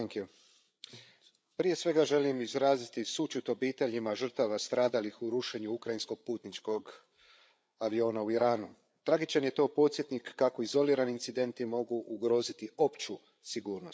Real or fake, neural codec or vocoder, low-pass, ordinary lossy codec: fake; codec, 16 kHz, 8 kbps, FreqCodec, larger model; none; none